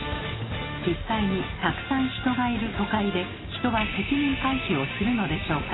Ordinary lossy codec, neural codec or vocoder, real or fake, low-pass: AAC, 16 kbps; none; real; 7.2 kHz